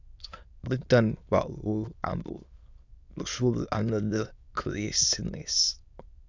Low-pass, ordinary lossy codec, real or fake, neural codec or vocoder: 7.2 kHz; Opus, 64 kbps; fake; autoencoder, 22.05 kHz, a latent of 192 numbers a frame, VITS, trained on many speakers